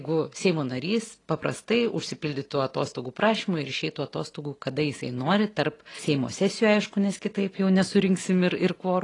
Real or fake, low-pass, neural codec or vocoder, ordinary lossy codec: real; 10.8 kHz; none; AAC, 32 kbps